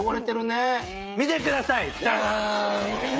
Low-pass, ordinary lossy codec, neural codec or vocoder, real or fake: none; none; codec, 16 kHz, 16 kbps, FreqCodec, smaller model; fake